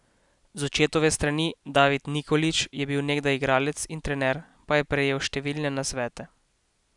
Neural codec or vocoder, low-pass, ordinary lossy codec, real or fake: none; 10.8 kHz; none; real